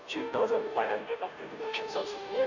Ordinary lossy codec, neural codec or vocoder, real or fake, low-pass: none; codec, 16 kHz, 0.5 kbps, FunCodec, trained on Chinese and English, 25 frames a second; fake; 7.2 kHz